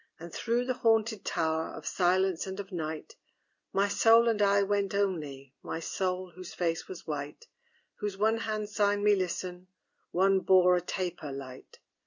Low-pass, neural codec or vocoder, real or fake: 7.2 kHz; none; real